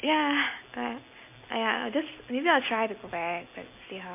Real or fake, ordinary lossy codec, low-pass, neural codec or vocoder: real; MP3, 24 kbps; 3.6 kHz; none